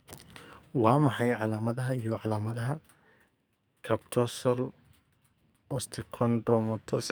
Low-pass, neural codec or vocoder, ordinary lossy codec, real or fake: none; codec, 44.1 kHz, 2.6 kbps, SNAC; none; fake